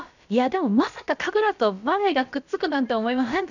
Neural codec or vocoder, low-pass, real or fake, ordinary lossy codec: codec, 16 kHz, about 1 kbps, DyCAST, with the encoder's durations; 7.2 kHz; fake; none